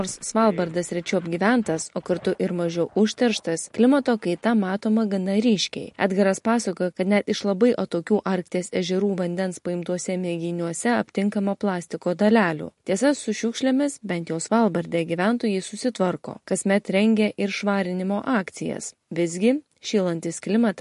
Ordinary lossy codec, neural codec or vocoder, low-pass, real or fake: MP3, 48 kbps; none; 14.4 kHz; real